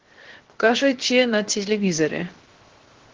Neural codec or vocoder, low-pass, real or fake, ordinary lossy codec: codec, 16 kHz, 0.7 kbps, FocalCodec; 7.2 kHz; fake; Opus, 16 kbps